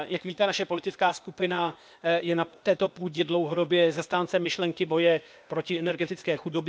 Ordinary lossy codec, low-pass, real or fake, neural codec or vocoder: none; none; fake; codec, 16 kHz, 0.8 kbps, ZipCodec